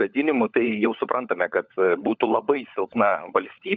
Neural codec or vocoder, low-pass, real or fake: codec, 16 kHz, 16 kbps, FunCodec, trained on LibriTTS, 50 frames a second; 7.2 kHz; fake